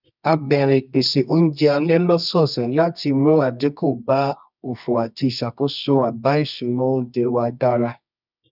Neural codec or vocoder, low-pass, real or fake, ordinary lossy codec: codec, 24 kHz, 0.9 kbps, WavTokenizer, medium music audio release; 5.4 kHz; fake; none